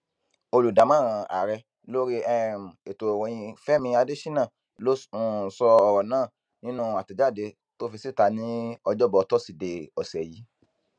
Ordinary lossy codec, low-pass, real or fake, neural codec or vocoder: none; 9.9 kHz; real; none